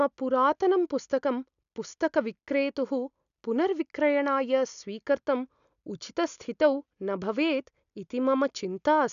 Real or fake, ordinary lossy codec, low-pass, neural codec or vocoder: real; none; 7.2 kHz; none